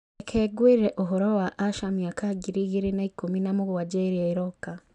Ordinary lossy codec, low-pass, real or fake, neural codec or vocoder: none; 10.8 kHz; real; none